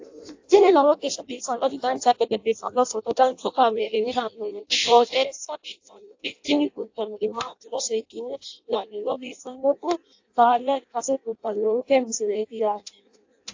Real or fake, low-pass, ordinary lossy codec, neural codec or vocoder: fake; 7.2 kHz; AAC, 48 kbps; codec, 16 kHz in and 24 kHz out, 0.6 kbps, FireRedTTS-2 codec